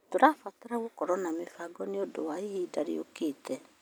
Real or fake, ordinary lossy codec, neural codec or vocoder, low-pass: real; none; none; none